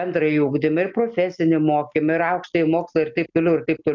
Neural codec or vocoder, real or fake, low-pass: none; real; 7.2 kHz